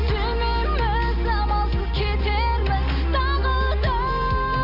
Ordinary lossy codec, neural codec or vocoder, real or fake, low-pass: none; none; real; 5.4 kHz